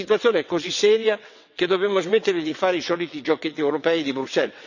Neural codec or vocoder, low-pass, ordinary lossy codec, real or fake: vocoder, 22.05 kHz, 80 mel bands, WaveNeXt; 7.2 kHz; none; fake